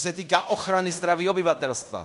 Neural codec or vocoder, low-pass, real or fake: codec, 16 kHz in and 24 kHz out, 0.9 kbps, LongCat-Audio-Codec, fine tuned four codebook decoder; 10.8 kHz; fake